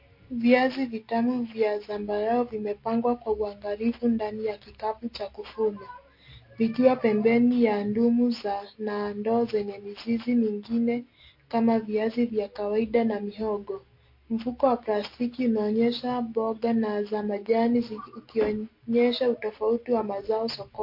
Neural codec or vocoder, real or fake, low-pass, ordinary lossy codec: none; real; 5.4 kHz; MP3, 32 kbps